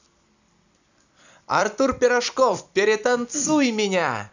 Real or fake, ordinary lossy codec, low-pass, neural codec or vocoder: fake; none; 7.2 kHz; vocoder, 44.1 kHz, 128 mel bands every 256 samples, BigVGAN v2